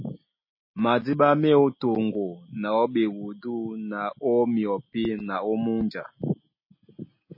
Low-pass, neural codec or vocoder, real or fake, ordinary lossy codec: 5.4 kHz; none; real; MP3, 24 kbps